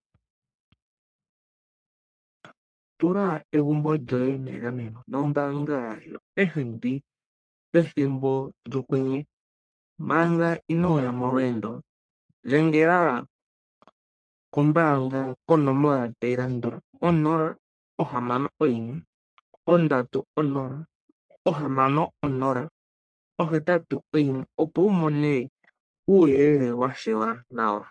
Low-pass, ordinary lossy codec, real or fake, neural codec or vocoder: 9.9 kHz; MP3, 64 kbps; fake; codec, 44.1 kHz, 1.7 kbps, Pupu-Codec